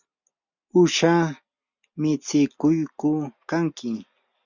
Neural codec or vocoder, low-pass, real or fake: none; 7.2 kHz; real